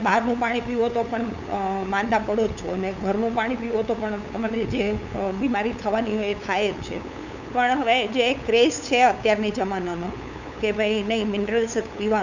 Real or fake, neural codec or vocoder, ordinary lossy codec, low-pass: fake; codec, 16 kHz, 8 kbps, FunCodec, trained on LibriTTS, 25 frames a second; none; 7.2 kHz